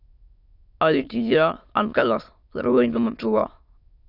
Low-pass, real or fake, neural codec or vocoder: 5.4 kHz; fake; autoencoder, 22.05 kHz, a latent of 192 numbers a frame, VITS, trained on many speakers